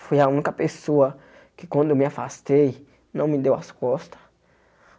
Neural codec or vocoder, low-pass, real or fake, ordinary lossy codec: none; none; real; none